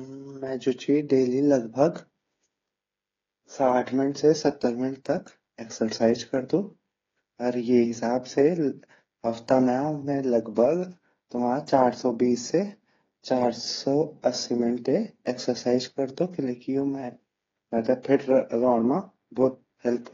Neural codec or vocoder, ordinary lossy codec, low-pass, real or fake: codec, 16 kHz, 8 kbps, FreqCodec, smaller model; AAC, 32 kbps; 7.2 kHz; fake